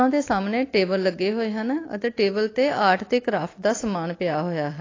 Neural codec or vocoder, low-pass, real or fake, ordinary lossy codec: none; 7.2 kHz; real; AAC, 32 kbps